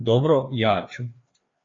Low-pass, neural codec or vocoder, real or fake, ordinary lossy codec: 7.2 kHz; codec, 16 kHz, 2 kbps, FreqCodec, larger model; fake; AAC, 32 kbps